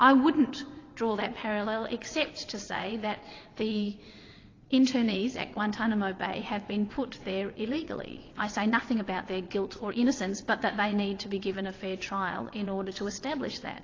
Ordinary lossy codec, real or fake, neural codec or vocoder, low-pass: AAC, 32 kbps; fake; vocoder, 22.05 kHz, 80 mel bands, WaveNeXt; 7.2 kHz